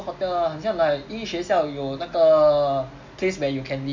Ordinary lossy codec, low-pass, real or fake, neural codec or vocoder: MP3, 64 kbps; 7.2 kHz; real; none